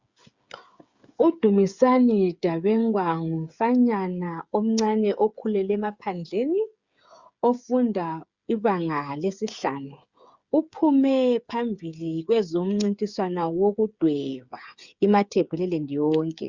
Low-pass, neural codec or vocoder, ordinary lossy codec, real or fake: 7.2 kHz; codec, 16 kHz, 8 kbps, FreqCodec, smaller model; Opus, 64 kbps; fake